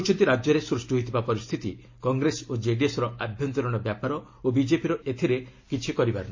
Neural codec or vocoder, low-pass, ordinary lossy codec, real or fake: none; 7.2 kHz; MP3, 32 kbps; real